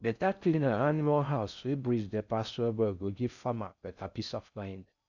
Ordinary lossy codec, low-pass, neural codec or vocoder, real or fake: none; 7.2 kHz; codec, 16 kHz in and 24 kHz out, 0.6 kbps, FocalCodec, streaming, 4096 codes; fake